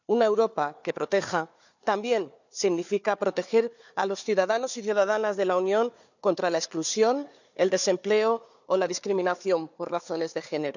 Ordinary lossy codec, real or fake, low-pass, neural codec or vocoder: none; fake; 7.2 kHz; codec, 16 kHz, 4 kbps, FunCodec, trained on Chinese and English, 50 frames a second